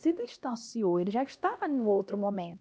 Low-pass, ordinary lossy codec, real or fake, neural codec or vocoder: none; none; fake; codec, 16 kHz, 1 kbps, X-Codec, HuBERT features, trained on LibriSpeech